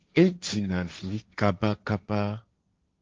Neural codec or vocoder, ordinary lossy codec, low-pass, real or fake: codec, 16 kHz, 1.1 kbps, Voila-Tokenizer; Opus, 32 kbps; 7.2 kHz; fake